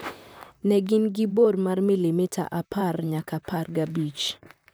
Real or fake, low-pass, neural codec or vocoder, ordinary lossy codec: fake; none; vocoder, 44.1 kHz, 128 mel bands, Pupu-Vocoder; none